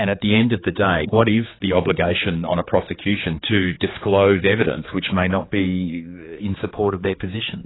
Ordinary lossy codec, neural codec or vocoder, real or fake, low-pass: AAC, 16 kbps; codec, 16 kHz, 4 kbps, X-Codec, HuBERT features, trained on general audio; fake; 7.2 kHz